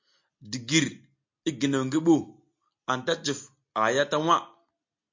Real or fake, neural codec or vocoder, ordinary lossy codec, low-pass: real; none; MP3, 48 kbps; 7.2 kHz